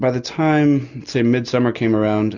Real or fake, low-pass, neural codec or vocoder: real; 7.2 kHz; none